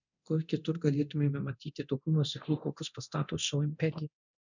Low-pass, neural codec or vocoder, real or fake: 7.2 kHz; codec, 24 kHz, 0.9 kbps, DualCodec; fake